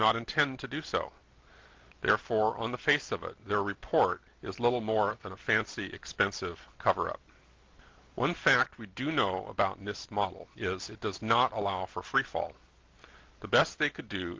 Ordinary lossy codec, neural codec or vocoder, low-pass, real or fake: Opus, 32 kbps; none; 7.2 kHz; real